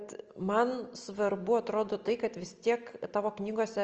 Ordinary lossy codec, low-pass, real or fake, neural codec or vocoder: Opus, 24 kbps; 7.2 kHz; real; none